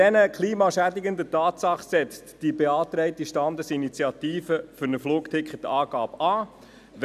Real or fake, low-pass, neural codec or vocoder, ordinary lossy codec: real; 14.4 kHz; none; none